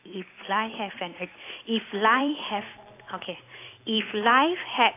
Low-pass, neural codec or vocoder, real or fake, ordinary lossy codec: 3.6 kHz; vocoder, 44.1 kHz, 128 mel bands every 256 samples, BigVGAN v2; fake; AAC, 24 kbps